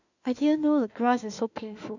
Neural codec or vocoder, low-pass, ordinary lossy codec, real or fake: autoencoder, 48 kHz, 32 numbers a frame, DAC-VAE, trained on Japanese speech; 7.2 kHz; none; fake